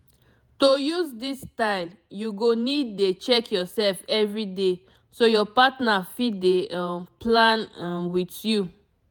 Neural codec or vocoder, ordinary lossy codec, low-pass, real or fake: vocoder, 48 kHz, 128 mel bands, Vocos; none; none; fake